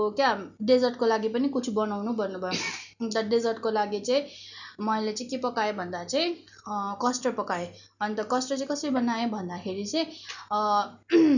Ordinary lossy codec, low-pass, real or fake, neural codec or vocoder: none; 7.2 kHz; real; none